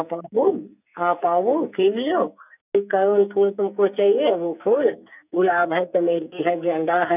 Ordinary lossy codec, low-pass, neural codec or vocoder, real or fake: none; 3.6 kHz; codec, 44.1 kHz, 2.6 kbps, SNAC; fake